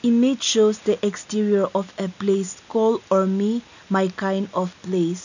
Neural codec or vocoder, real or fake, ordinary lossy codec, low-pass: none; real; none; 7.2 kHz